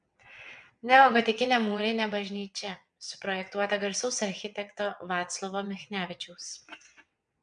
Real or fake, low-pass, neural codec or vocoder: fake; 9.9 kHz; vocoder, 22.05 kHz, 80 mel bands, WaveNeXt